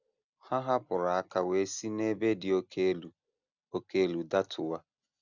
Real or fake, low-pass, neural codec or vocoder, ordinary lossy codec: real; 7.2 kHz; none; none